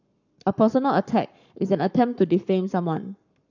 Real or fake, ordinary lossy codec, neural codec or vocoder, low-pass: fake; none; codec, 44.1 kHz, 7.8 kbps, Pupu-Codec; 7.2 kHz